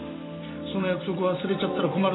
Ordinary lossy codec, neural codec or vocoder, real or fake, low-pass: AAC, 16 kbps; none; real; 7.2 kHz